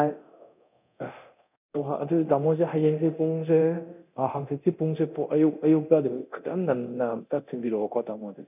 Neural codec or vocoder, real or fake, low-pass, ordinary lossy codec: codec, 24 kHz, 0.9 kbps, DualCodec; fake; 3.6 kHz; none